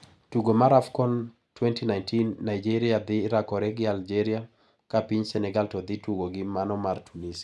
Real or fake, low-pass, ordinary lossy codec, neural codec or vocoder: real; none; none; none